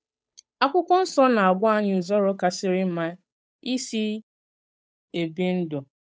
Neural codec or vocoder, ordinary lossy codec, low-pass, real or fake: codec, 16 kHz, 8 kbps, FunCodec, trained on Chinese and English, 25 frames a second; none; none; fake